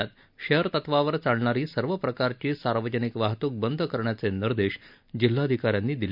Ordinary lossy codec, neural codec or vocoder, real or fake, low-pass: none; none; real; 5.4 kHz